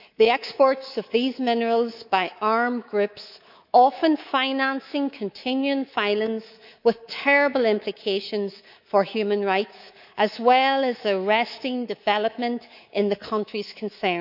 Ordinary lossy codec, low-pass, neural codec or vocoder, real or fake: none; 5.4 kHz; codec, 24 kHz, 3.1 kbps, DualCodec; fake